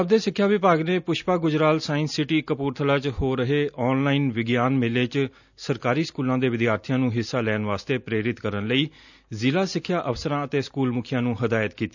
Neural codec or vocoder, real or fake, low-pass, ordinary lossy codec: none; real; 7.2 kHz; none